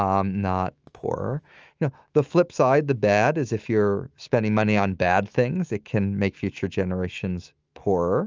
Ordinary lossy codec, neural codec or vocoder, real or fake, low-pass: Opus, 24 kbps; autoencoder, 48 kHz, 128 numbers a frame, DAC-VAE, trained on Japanese speech; fake; 7.2 kHz